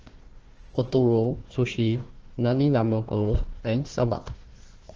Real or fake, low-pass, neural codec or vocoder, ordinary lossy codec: fake; 7.2 kHz; codec, 16 kHz, 1 kbps, FunCodec, trained on Chinese and English, 50 frames a second; Opus, 16 kbps